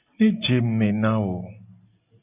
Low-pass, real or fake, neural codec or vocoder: 3.6 kHz; real; none